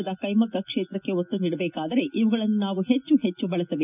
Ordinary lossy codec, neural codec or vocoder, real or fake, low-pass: none; none; real; 3.6 kHz